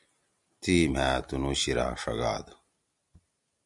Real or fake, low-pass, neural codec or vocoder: real; 10.8 kHz; none